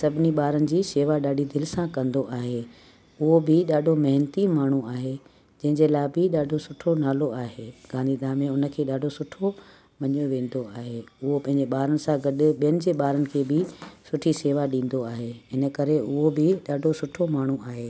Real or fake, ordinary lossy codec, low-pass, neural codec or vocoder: real; none; none; none